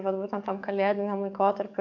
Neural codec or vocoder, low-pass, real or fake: codec, 44.1 kHz, 7.8 kbps, DAC; 7.2 kHz; fake